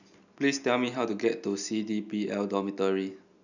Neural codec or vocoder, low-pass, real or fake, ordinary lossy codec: none; 7.2 kHz; real; none